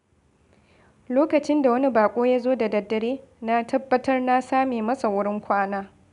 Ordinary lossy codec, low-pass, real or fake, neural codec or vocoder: none; 10.8 kHz; real; none